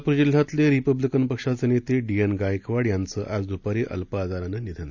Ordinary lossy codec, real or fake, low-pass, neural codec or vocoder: none; real; 7.2 kHz; none